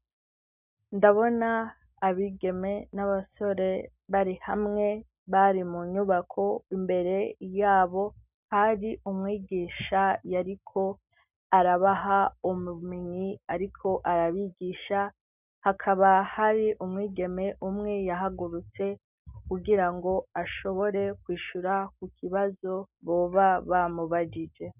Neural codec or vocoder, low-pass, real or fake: none; 3.6 kHz; real